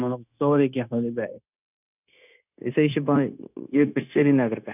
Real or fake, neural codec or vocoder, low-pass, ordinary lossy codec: fake; codec, 16 kHz, 0.9 kbps, LongCat-Audio-Codec; 3.6 kHz; none